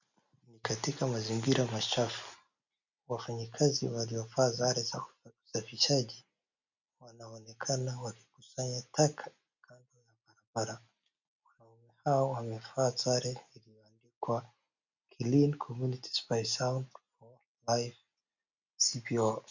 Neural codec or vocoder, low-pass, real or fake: none; 7.2 kHz; real